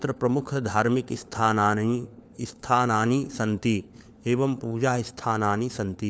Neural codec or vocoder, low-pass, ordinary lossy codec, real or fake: codec, 16 kHz, 4 kbps, FunCodec, trained on LibriTTS, 50 frames a second; none; none; fake